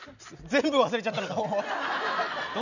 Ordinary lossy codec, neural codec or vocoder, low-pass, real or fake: none; none; 7.2 kHz; real